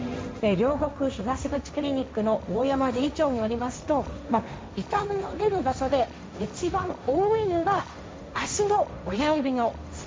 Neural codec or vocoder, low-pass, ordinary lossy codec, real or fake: codec, 16 kHz, 1.1 kbps, Voila-Tokenizer; none; none; fake